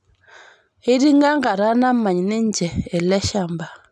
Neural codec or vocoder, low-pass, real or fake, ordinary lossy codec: none; none; real; none